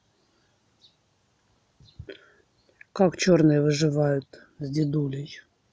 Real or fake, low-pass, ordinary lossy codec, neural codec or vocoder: real; none; none; none